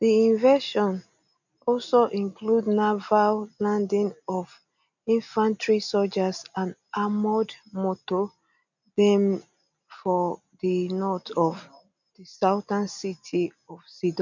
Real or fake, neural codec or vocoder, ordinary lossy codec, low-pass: real; none; none; 7.2 kHz